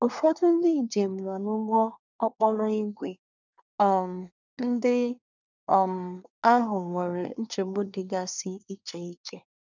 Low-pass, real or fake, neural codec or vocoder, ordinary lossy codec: 7.2 kHz; fake; codec, 24 kHz, 1 kbps, SNAC; none